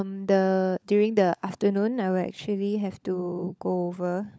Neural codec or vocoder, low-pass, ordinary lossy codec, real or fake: codec, 16 kHz, 16 kbps, FunCodec, trained on LibriTTS, 50 frames a second; none; none; fake